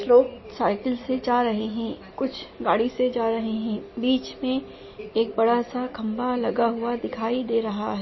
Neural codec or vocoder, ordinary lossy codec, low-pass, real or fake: none; MP3, 24 kbps; 7.2 kHz; real